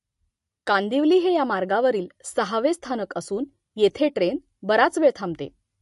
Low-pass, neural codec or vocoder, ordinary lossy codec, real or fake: 14.4 kHz; none; MP3, 48 kbps; real